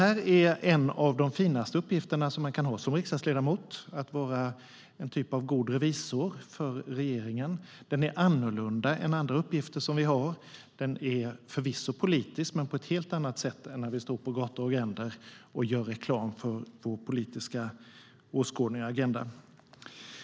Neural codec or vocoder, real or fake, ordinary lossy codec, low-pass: none; real; none; none